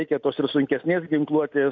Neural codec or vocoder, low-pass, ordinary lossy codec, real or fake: none; 7.2 kHz; AAC, 48 kbps; real